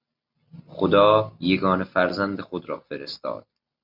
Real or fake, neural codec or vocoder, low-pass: real; none; 5.4 kHz